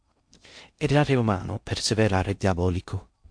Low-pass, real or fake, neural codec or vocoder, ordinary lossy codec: 9.9 kHz; fake; codec, 16 kHz in and 24 kHz out, 0.6 kbps, FocalCodec, streaming, 2048 codes; MP3, 64 kbps